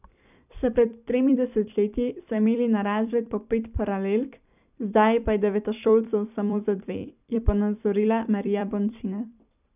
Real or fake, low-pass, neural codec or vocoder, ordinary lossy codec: fake; 3.6 kHz; vocoder, 44.1 kHz, 128 mel bands every 256 samples, BigVGAN v2; none